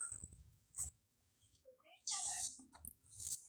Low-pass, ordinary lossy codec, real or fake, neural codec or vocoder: none; none; fake; codec, 44.1 kHz, 7.8 kbps, DAC